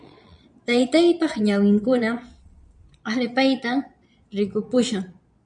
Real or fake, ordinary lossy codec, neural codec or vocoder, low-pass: fake; MP3, 96 kbps; vocoder, 22.05 kHz, 80 mel bands, Vocos; 9.9 kHz